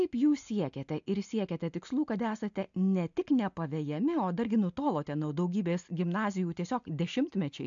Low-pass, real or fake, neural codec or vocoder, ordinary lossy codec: 7.2 kHz; real; none; AAC, 64 kbps